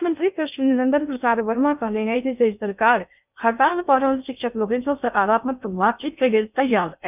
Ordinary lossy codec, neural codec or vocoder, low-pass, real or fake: none; codec, 16 kHz in and 24 kHz out, 0.6 kbps, FocalCodec, streaming, 2048 codes; 3.6 kHz; fake